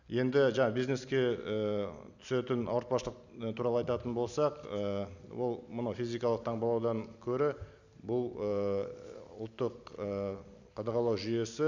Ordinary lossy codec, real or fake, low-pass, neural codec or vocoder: none; real; 7.2 kHz; none